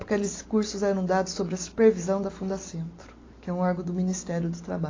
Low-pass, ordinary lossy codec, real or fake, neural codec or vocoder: 7.2 kHz; AAC, 32 kbps; real; none